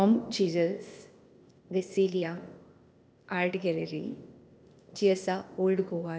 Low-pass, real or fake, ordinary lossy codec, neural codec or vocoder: none; fake; none; codec, 16 kHz, 0.8 kbps, ZipCodec